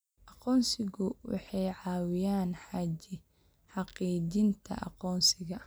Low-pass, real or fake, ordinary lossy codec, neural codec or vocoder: none; real; none; none